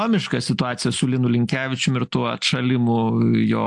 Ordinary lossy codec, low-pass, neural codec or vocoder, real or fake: AAC, 64 kbps; 10.8 kHz; none; real